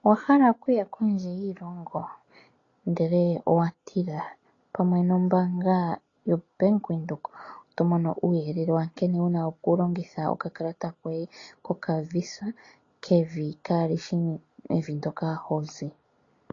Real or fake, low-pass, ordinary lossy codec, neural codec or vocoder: real; 7.2 kHz; AAC, 32 kbps; none